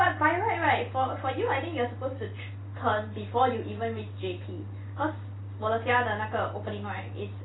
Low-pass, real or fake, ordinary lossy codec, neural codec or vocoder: 7.2 kHz; fake; AAC, 16 kbps; vocoder, 44.1 kHz, 128 mel bands every 256 samples, BigVGAN v2